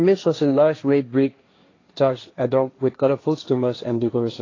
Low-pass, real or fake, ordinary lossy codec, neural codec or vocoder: 7.2 kHz; fake; AAC, 32 kbps; codec, 16 kHz, 1.1 kbps, Voila-Tokenizer